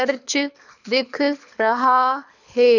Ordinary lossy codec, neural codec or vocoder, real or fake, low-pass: none; codec, 24 kHz, 6 kbps, HILCodec; fake; 7.2 kHz